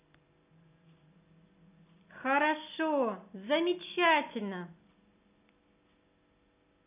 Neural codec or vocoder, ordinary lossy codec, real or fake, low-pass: none; none; real; 3.6 kHz